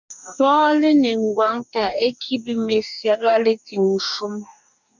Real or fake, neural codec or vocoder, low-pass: fake; codec, 44.1 kHz, 2.6 kbps, DAC; 7.2 kHz